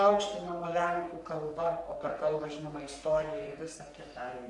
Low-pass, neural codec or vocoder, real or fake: 10.8 kHz; codec, 44.1 kHz, 3.4 kbps, Pupu-Codec; fake